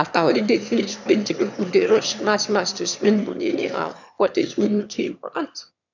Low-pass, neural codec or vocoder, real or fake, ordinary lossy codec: 7.2 kHz; autoencoder, 22.05 kHz, a latent of 192 numbers a frame, VITS, trained on one speaker; fake; none